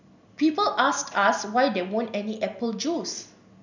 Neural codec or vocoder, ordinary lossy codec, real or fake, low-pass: none; none; real; 7.2 kHz